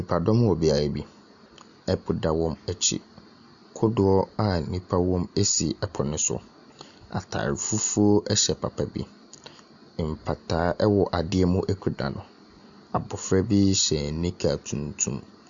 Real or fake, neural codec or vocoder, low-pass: real; none; 7.2 kHz